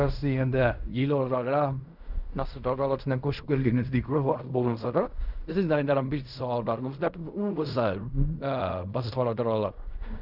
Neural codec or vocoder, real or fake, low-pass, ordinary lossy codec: codec, 16 kHz in and 24 kHz out, 0.4 kbps, LongCat-Audio-Codec, fine tuned four codebook decoder; fake; 5.4 kHz; none